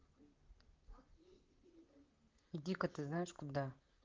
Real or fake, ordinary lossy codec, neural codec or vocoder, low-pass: fake; Opus, 32 kbps; vocoder, 44.1 kHz, 128 mel bands, Pupu-Vocoder; 7.2 kHz